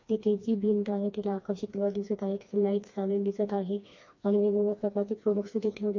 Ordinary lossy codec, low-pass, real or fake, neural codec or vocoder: MP3, 48 kbps; 7.2 kHz; fake; codec, 16 kHz, 2 kbps, FreqCodec, smaller model